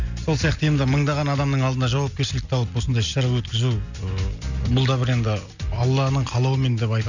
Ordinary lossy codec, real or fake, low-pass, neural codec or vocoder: none; real; 7.2 kHz; none